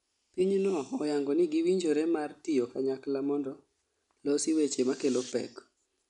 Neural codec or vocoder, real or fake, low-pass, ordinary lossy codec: none; real; 10.8 kHz; none